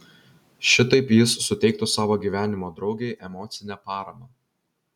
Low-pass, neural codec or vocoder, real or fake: 19.8 kHz; none; real